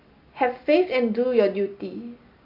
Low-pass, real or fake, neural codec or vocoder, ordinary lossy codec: 5.4 kHz; real; none; MP3, 48 kbps